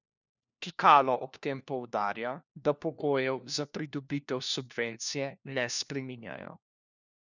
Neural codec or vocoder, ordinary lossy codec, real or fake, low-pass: codec, 16 kHz, 1 kbps, FunCodec, trained on LibriTTS, 50 frames a second; none; fake; 7.2 kHz